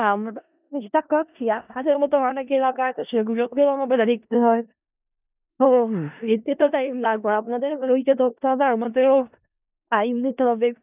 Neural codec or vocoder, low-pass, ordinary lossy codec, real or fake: codec, 16 kHz in and 24 kHz out, 0.4 kbps, LongCat-Audio-Codec, four codebook decoder; 3.6 kHz; none; fake